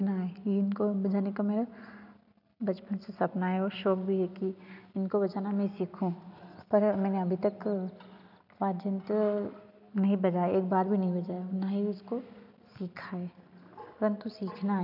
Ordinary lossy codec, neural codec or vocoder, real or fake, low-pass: none; none; real; 5.4 kHz